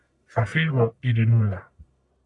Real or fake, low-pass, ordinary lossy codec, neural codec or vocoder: fake; 10.8 kHz; MP3, 96 kbps; codec, 44.1 kHz, 1.7 kbps, Pupu-Codec